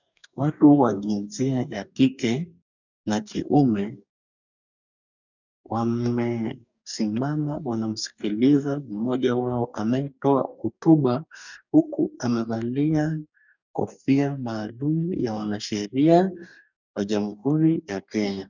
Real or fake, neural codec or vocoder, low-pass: fake; codec, 44.1 kHz, 2.6 kbps, DAC; 7.2 kHz